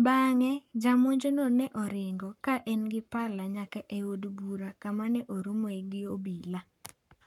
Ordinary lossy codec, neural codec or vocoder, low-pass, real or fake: none; codec, 44.1 kHz, 7.8 kbps, Pupu-Codec; 19.8 kHz; fake